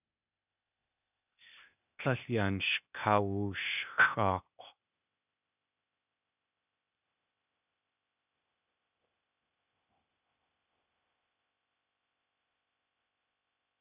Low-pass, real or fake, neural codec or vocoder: 3.6 kHz; fake; codec, 16 kHz, 0.8 kbps, ZipCodec